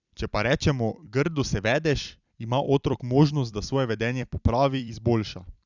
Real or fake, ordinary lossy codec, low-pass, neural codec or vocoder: fake; none; 7.2 kHz; vocoder, 44.1 kHz, 128 mel bands every 256 samples, BigVGAN v2